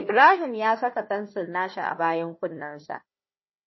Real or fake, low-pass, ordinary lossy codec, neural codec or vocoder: fake; 7.2 kHz; MP3, 24 kbps; codec, 16 kHz, 1 kbps, FunCodec, trained on Chinese and English, 50 frames a second